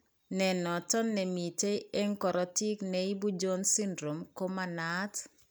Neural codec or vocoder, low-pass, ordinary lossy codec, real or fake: none; none; none; real